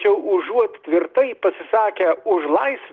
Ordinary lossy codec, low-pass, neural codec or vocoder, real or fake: Opus, 16 kbps; 7.2 kHz; none; real